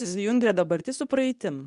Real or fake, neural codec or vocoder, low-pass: fake; codec, 24 kHz, 0.9 kbps, WavTokenizer, medium speech release version 2; 10.8 kHz